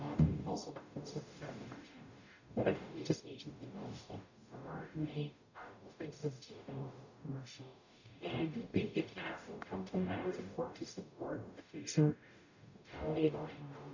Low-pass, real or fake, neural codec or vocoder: 7.2 kHz; fake; codec, 44.1 kHz, 0.9 kbps, DAC